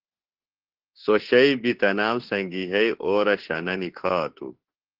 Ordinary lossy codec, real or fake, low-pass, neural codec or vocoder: Opus, 16 kbps; fake; 5.4 kHz; autoencoder, 48 kHz, 32 numbers a frame, DAC-VAE, trained on Japanese speech